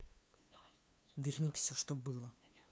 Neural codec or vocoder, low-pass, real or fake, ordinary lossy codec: codec, 16 kHz, 2 kbps, FunCodec, trained on LibriTTS, 25 frames a second; none; fake; none